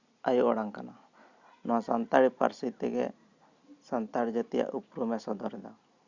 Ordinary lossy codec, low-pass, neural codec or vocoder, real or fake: Opus, 64 kbps; 7.2 kHz; none; real